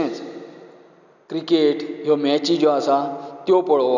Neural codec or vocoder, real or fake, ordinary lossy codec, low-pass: none; real; none; 7.2 kHz